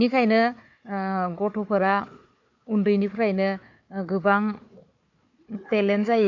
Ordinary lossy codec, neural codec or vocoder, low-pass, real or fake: MP3, 48 kbps; codec, 16 kHz, 4 kbps, FunCodec, trained on Chinese and English, 50 frames a second; 7.2 kHz; fake